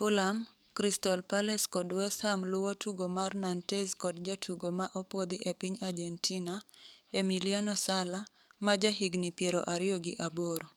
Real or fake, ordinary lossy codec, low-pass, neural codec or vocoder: fake; none; none; codec, 44.1 kHz, 7.8 kbps, DAC